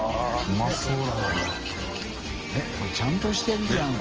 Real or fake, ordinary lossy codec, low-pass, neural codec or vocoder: real; Opus, 24 kbps; 7.2 kHz; none